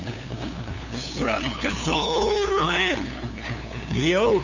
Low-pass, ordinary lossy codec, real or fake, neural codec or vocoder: 7.2 kHz; none; fake; codec, 16 kHz, 2 kbps, FunCodec, trained on LibriTTS, 25 frames a second